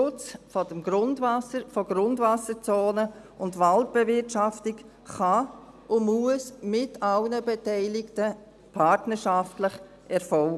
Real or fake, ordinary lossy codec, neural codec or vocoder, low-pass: real; none; none; none